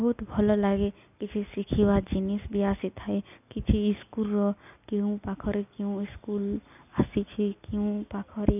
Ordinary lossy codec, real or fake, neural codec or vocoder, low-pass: none; real; none; 3.6 kHz